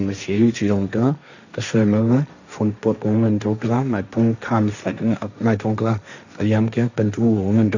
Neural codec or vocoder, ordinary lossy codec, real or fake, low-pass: codec, 16 kHz, 1.1 kbps, Voila-Tokenizer; none; fake; 7.2 kHz